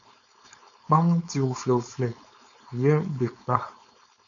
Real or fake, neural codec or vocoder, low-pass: fake; codec, 16 kHz, 4.8 kbps, FACodec; 7.2 kHz